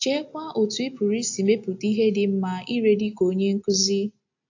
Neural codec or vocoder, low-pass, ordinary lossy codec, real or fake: none; 7.2 kHz; AAC, 48 kbps; real